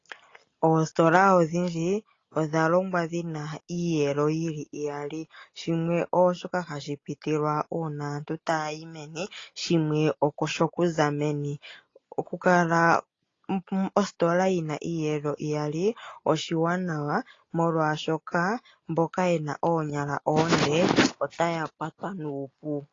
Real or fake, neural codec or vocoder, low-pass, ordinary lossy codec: real; none; 7.2 kHz; AAC, 32 kbps